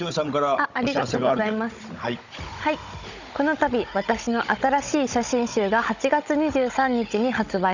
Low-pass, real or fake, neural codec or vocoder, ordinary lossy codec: 7.2 kHz; fake; codec, 16 kHz, 16 kbps, FunCodec, trained on Chinese and English, 50 frames a second; none